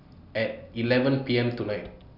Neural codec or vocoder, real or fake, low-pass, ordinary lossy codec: none; real; 5.4 kHz; none